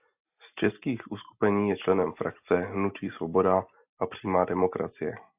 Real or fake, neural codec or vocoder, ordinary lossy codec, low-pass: real; none; AAC, 32 kbps; 3.6 kHz